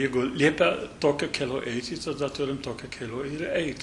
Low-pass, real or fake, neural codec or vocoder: 10.8 kHz; real; none